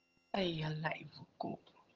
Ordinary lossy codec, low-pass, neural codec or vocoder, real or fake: Opus, 24 kbps; 7.2 kHz; vocoder, 22.05 kHz, 80 mel bands, HiFi-GAN; fake